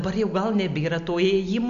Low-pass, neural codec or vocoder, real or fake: 7.2 kHz; none; real